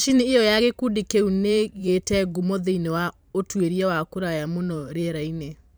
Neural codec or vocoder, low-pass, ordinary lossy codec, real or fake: vocoder, 44.1 kHz, 128 mel bands every 512 samples, BigVGAN v2; none; none; fake